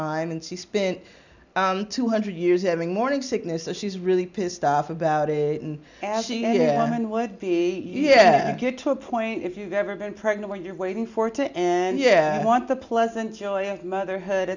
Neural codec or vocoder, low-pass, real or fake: none; 7.2 kHz; real